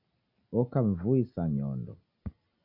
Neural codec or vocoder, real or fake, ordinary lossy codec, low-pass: none; real; MP3, 48 kbps; 5.4 kHz